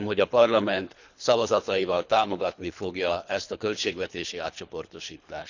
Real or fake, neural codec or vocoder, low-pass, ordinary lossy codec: fake; codec, 24 kHz, 3 kbps, HILCodec; 7.2 kHz; none